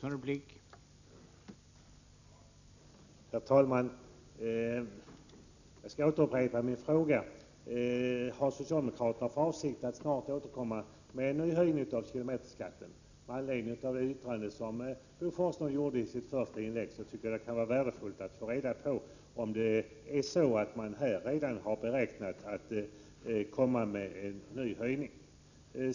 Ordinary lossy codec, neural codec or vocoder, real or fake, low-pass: none; none; real; 7.2 kHz